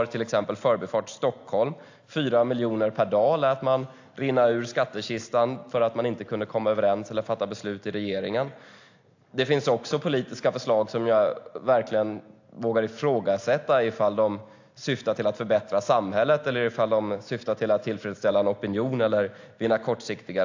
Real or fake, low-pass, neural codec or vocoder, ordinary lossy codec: real; 7.2 kHz; none; AAC, 48 kbps